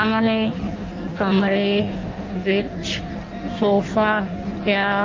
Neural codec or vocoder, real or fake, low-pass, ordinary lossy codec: codec, 16 kHz in and 24 kHz out, 0.6 kbps, FireRedTTS-2 codec; fake; 7.2 kHz; Opus, 24 kbps